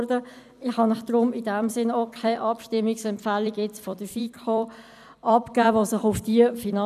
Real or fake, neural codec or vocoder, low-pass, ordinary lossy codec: fake; vocoder, 44.1 kHz, 128 mel bands every 256 samples, BigVGAN v2; 14.4 kHz; none